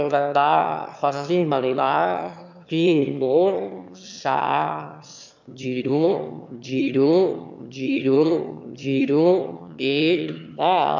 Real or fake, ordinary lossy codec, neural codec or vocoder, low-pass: fake; MP3, 64 kbps; autoencoder, 22.05 kHz, a latent of 192 numbers a frame, VITS, trained on one speaker; 7.2 kHz